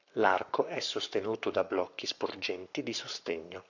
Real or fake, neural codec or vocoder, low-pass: fake; codec, 44.1 kHz, 7.8 kbps, Pupu-Codec; 7.2 kHz